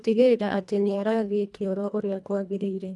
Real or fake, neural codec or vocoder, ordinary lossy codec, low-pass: fake; codec, 24 kHz, 1.5 kbps, HILCodec; none; none